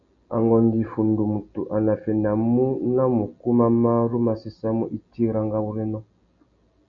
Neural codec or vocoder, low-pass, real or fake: none; 7.2 kHz; real